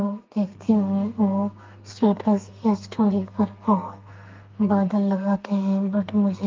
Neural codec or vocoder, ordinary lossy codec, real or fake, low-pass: codec, 32 kHz, 1.9 kbps, SNAC; Opus, 24 kbps; fake; 7.2 kHz